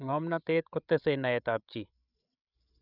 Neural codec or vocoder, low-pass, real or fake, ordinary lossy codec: codec, 16 kHz, 16 kbps, FreqCodec, larger model; 5.4 kHz; fake; none